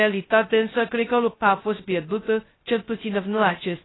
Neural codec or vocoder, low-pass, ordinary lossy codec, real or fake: codec, 16 kHz, 0.2 kbps, FocalCodec; 7.2 kHz; AAC, 16 kbps; fake